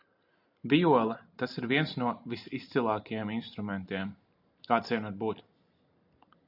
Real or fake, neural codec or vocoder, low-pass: real; none; 5.4 kHz